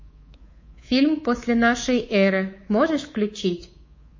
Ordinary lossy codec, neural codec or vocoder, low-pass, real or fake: MP3, 32 kbps; codec, 24 kHz, 3.1 kbps, DualCodec; 7.2 kHz; fake